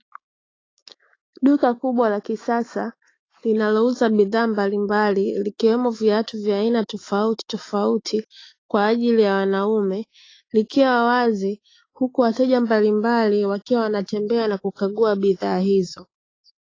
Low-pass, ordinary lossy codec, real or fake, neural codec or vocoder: 7.2 kHz; AAC, 32 kbps; fake; autoencoder, 48 kHz, 128 numbers a frame, DAC-VAE, trained on Japanese speech